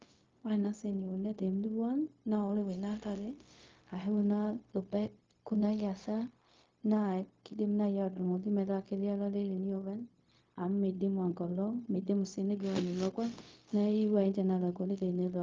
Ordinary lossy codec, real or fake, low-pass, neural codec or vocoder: Opus, 32 kbps; fake; 7.2 kHz; codec, 16 kHz, 0.4 kbps, LongCat-Audio-Codec